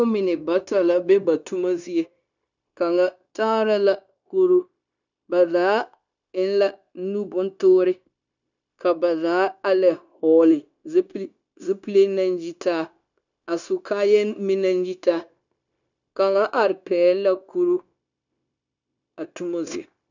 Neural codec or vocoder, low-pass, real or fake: codec, 16 kHz, 0.9 kbps, LongCat-Audio-Codec; 7.2 kHz; fake